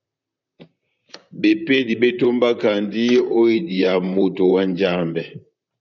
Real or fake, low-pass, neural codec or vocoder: fake; 7.2 kHz; vocoder, 44.1 kHz, 128 mel bands, Pupu-Vocoder